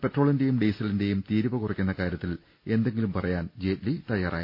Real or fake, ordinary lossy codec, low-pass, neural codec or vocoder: real; MP3, 32 kbps; 5.4 kHz; none